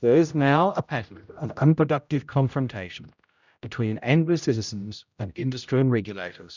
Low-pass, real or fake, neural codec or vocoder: 7.2 kHz; fake; codec, 16 kHz, 0.5 kbps, X-Codec, HuBERT features, trained on general audio